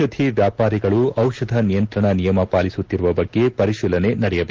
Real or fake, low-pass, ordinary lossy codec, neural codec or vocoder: real; 7.2 kHz; Opus, 16 kbps; none